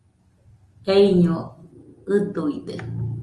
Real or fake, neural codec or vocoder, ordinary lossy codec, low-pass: real; none; Opus, 32 kbps; 10.8 kHz